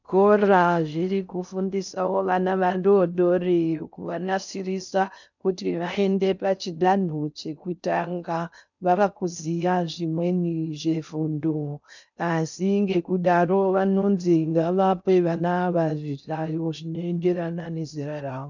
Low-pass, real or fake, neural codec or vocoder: 7.2 kHz; fake; codec, 16 kHz in and 24 kHz out, 0.6 kbps, FocalCodec, streaming, 4096 codes